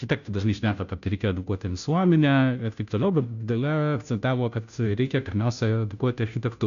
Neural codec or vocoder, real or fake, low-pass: codec, 16 kHz, 0.5 kbps, FunCodec, trained on Chinese and English, 25 frames a second; fake; 7.2 kHz